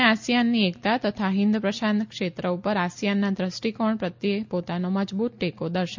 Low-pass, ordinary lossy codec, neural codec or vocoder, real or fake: 7.2 kHz; MP3, 64 kbps; none; real